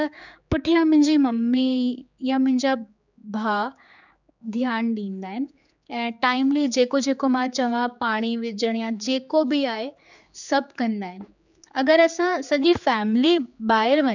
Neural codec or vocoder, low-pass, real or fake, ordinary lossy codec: codec, 16 kHz, 4 kbps, X-Codec, HuBERT features, trained on general audio; 7.2 kHz; fake; none